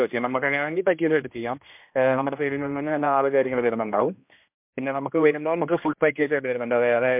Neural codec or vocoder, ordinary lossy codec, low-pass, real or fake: codec, 16 kHz, 1 kbps, X-Codec, HuBERT features, trained on general audio; MP3, 32 kbps; 3.6 kHz; fake